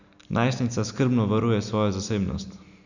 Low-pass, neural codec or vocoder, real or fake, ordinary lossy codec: 7.2 kHz; none; real; none